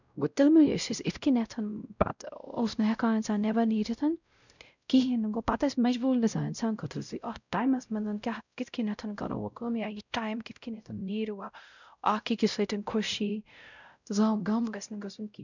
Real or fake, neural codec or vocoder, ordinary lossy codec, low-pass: fake; codec, 16 kHz, 0.5 kbps, X-Codec, WavLM features, trained on Multilingual LibriSpeech; none; 7.2 kHz